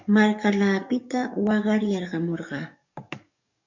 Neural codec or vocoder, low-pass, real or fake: codec, 44.1 kHz, 7.8 kbps, DAC; 7.2 kHz; fake